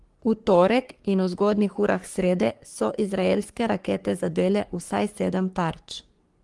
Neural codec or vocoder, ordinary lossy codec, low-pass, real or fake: codec, 44.1 kHz, 3.4 kbps, Pupu-Codec; Opus, 24 kbps; 10.8 kHz; fake